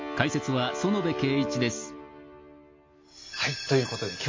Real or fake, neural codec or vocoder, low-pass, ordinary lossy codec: real; none; 7.2 kHz; MP3, 48 kbps